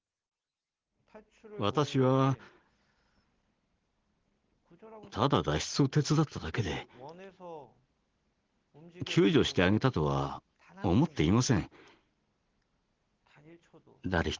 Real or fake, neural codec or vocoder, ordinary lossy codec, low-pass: real; none; Opus, 16 kbps; 7.2 kHz